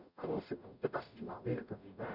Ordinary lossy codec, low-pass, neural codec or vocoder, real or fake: none; 5.4 kHz; codec, 44.1 kHz, 0.9 kbps, DAC; fake